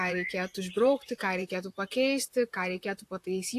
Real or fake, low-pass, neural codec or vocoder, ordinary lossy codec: fake; 14.4 kHz; vocoder, 44.1 kHz, 128 mel bands, Pupu-Vocoder; AAC, 64 kbps